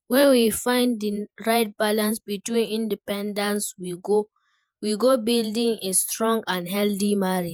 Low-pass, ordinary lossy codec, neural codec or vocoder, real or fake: none; none; vocoder, 48 kHz, 128 mel bands, Vocos; fake